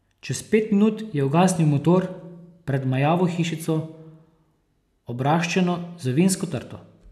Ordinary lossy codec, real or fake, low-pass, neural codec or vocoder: none; real; 14.4 kHz; none